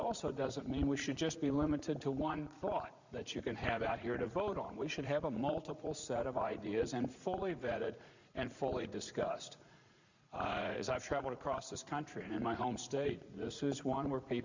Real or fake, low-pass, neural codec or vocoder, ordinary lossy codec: fake; 7.2 kHz; vocoder, 44.1 kHz, 80 mel bands, Vocos; Opus, 64 kbps